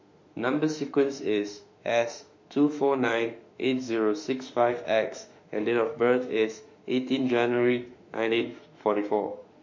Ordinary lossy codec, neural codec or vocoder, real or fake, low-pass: MP3, 48 kbps; autoencoder, 48 kHz, 32 numbers a frame, DAC-VAE, trained on Japanese speech; fake; 7.2 kHz